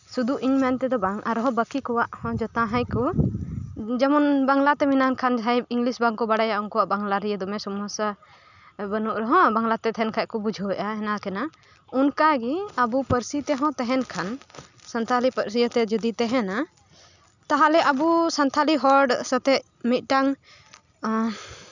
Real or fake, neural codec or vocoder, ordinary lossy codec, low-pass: real; none; none; 7.2 kHz